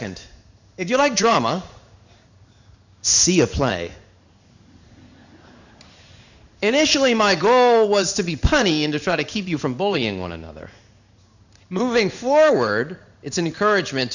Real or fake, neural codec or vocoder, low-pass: fake; codec, 16 kHz in and 24 kHz out, 1 kbps, XY-Tokenizer; 7.2 kHz